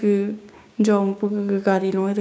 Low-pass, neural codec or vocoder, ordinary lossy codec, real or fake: none; codec, 16 kHz, 6 kbps, DAC; none; fake